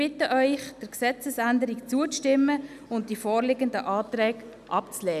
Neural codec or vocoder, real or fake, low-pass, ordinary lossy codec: none; real; 14.4 kHz; none